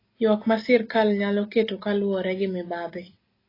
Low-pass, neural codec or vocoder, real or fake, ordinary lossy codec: 5.4 kHz; none; real; AAC, 24 kbps